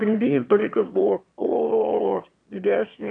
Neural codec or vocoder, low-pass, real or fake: autoencoder, 22.05 kHz, a latent of 192 numbers a frame, VITS, trained on one speaker; 9.9 kHz; fake